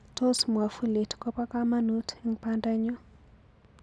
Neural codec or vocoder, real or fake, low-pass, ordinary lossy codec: none; real; none; none